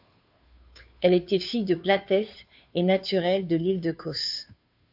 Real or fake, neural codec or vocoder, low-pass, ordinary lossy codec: fake; codec, 16 kHz, 2 kbps, FunCodec, trained on Chinese and English, 25 frames a second; 5.4 kHz; AAC, 48 kbps